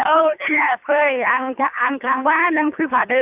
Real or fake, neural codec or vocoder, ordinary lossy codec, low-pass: fake; codec, 24 kHz, 3 kbps, HILCodec; AAC, 32 kbps; 3.6 kHz